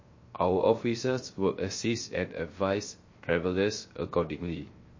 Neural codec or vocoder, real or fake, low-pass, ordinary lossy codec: codec, 16 kHz, 0.3 kbps, FocalCodec; fake; 7.2 kHz; MP3, 32 kbps